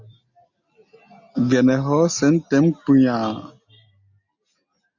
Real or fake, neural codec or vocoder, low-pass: real; none; 7.2 kHz